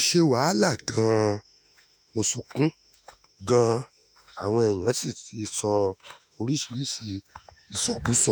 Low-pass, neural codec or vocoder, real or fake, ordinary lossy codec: none; autoencoder, 48 kHz, 32 numbers a frame, DAC-VAE, trained on Japanese speech; fake; none